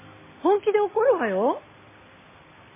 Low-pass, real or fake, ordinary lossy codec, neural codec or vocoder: 3.6 kHz; real; MP3, 16 kbps; none